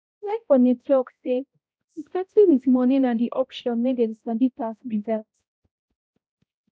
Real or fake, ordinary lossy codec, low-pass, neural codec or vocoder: fake; none; none; codec, 16 kHz, 0.5 kbps, X-Codec, HuBERT features, trained on balanced general audio